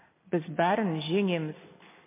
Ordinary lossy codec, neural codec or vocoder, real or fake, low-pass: MP3, 24 kbps; none; real; 3.6 kHz